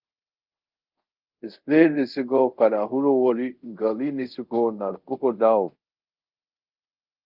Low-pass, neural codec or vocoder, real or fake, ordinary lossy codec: 5.4 kHz; codec, 24 kHz, 0.5 kbps, DualCodec; fake; Opus, 32 kbps